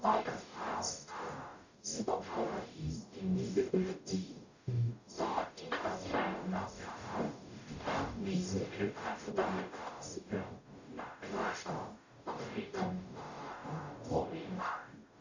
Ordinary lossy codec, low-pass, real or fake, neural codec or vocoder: none; 7.2 kHz; fake; codec, 44.1 kHz, 0.9 kbps, DAC